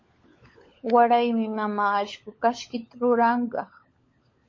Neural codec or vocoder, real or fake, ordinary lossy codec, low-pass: codec, 16 kHz, 16 kbps, FunCodec, trained on LibriTTS, 50 frames a second; fake; MP3, 32 kbps; 7.2 kHz